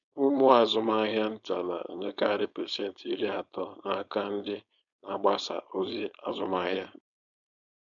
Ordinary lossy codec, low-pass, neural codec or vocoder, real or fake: MP3, 96 kbps; 7.2 kHz; codec, 16 kHz, 4.8 kbps, FACodec; fake